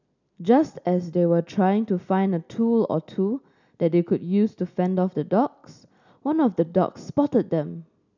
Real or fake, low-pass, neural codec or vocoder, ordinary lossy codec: real; 7.2 kHz; none; none